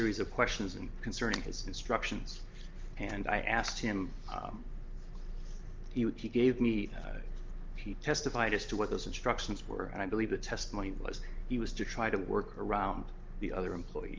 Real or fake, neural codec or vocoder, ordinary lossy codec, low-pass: real; none; Opus, 24 kbps; 7.2 kHz